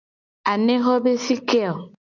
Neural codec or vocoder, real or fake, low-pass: none; real; 7.2 kHz